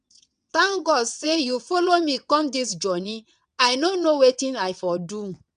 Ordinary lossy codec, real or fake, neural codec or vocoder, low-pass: none; fake; vocoder, 22.05 kHz, 80 mel bands, WaveNeXt; 9.9 kHz